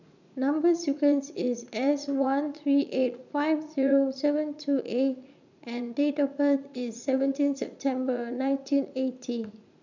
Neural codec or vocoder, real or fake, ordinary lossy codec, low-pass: vocoder, 44.1 kHz, 80 mel bands, Vocos; fake; none; 7.2 kHz